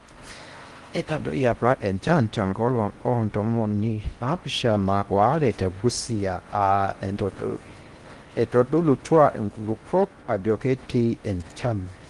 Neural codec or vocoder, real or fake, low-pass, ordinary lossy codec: codec, 16 kHz in and 24 kHz out, 0.6 kbps, FocalCodec, streaming, 4096 codes; fake; 10.8 kHz; Opus, 24 kbps